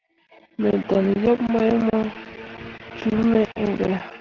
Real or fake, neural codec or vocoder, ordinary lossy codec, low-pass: real; none; Opus, 16 kbps; 7.2 kHz